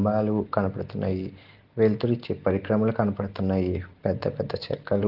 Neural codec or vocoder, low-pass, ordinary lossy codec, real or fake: none; 5.4 kHz; Opus, 16 kbps; real